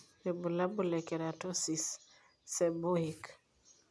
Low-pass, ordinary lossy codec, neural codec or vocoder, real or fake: none; none; none; real